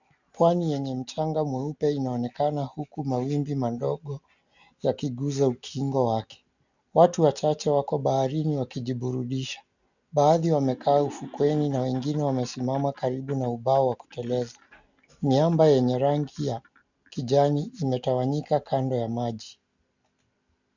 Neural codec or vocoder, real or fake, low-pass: none; real; 7.2 kHz